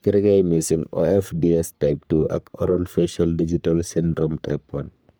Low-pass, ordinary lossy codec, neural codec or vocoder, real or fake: none; none; codec, 44.1 kHz, 3.4 kbps, Pupu-Codec; fake